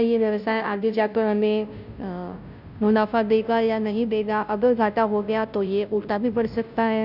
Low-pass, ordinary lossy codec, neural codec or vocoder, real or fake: 5.4 kHz; none; codec, 16 kHz, 0.5 kbps, FunCodec, trained on Chinese and English, 25 frames a second; fake